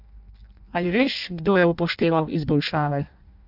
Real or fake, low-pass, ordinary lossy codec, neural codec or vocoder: fake; 5.4 kHz; none; codec, 16 kHz in and 24 kHz out, 0.6 kbps, FireRedTTS-2 codec